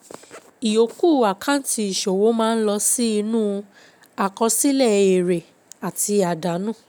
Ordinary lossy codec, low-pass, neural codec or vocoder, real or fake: none; none; none; real